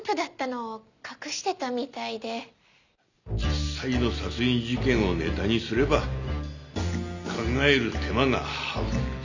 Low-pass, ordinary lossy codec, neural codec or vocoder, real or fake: 7.2 kHz; none; none; real